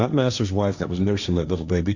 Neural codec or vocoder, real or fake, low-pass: codec, 16 kHz, 1.1 kbps, Voila-Tokenizer; fake; 7.2 kHz